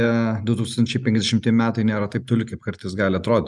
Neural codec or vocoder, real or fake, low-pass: none; real; 10.8 kHz